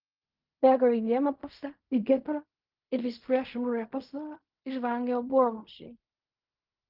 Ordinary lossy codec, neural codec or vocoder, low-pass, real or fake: Opus, 24 kbps; codec, 16 kHz in and 24 kHz out, 0.4 kbps, LongCat-Audio-Codec, fine tuned four codebook decoder; 5.4 kHz; fake